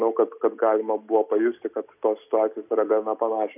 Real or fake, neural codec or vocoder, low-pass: real; none; 3.6 kHz